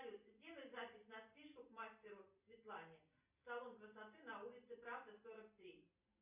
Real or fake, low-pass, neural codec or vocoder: real; 3.6 kHz; none